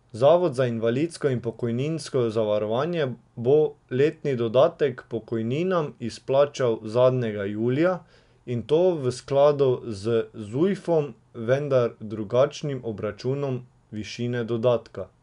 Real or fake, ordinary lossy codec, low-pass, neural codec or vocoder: real; none; 10.8 kHz; none